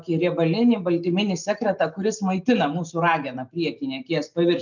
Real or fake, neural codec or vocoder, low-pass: real; none; 7.2 kHz